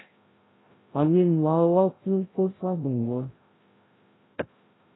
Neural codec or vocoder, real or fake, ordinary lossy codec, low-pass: codec, 16 kHz, 0.5 kbps, FreqCodec, larger model; fake; AAC, 16 kbps; 7.2 kHz